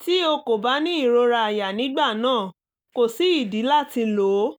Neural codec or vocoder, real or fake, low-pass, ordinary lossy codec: none; real; none; none